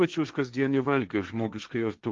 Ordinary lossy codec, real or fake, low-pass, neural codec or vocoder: Opus, 32 kbps; fake; 7.2 kHz; codec, 16 kHz, 1.1 kbps, Voila-Tokenizer